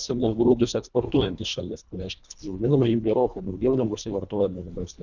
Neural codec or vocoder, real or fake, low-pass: codec, 24 kHz, 1.5 kbps, HILCodec; fake; 7.2 kHz